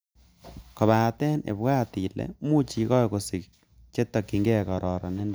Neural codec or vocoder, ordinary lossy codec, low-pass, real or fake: none; none; none; real